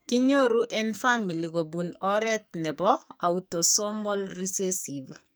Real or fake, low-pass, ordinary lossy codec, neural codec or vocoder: fake; none; none; codec, 44.1 kHz, 2.6 kbps, SNAC